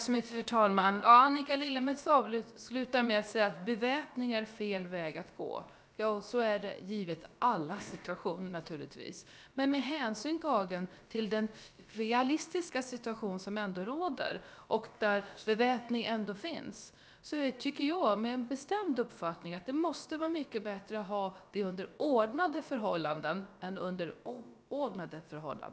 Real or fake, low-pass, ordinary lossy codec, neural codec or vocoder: fake; none; none; codec, 16 kHz, about 1 kbps, DyCAST, with the encoder's durations